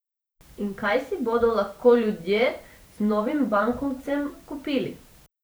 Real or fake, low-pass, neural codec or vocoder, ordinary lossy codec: fake; none; vocoder, 44.1 kHz, 128 mel bands every 512 samples, BigVGAN v2; none